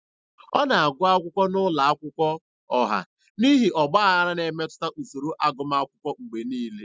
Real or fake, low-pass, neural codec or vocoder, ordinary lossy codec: real; none; none; none